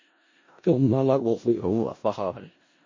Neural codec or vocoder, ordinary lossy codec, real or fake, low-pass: codec, 16 kHz in and 24 kHz out, 0.4 kbps, LongCat-Audio-Codec, four codebook decoder; MP3, 32 kbps; fake; 7.2 kHz